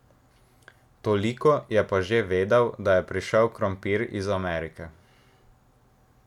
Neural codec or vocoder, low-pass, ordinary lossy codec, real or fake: none; 19.8 kHz; none; real